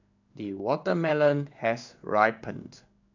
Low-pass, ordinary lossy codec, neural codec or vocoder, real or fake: 7.2 kHz; none; codec, 16 kHz in and 24 kHz out, 1 kbps, XY-Tokenizer; fake